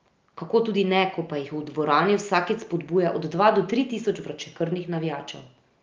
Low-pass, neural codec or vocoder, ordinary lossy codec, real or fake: 7.2 kHz; none; Opus, 32 kbps; real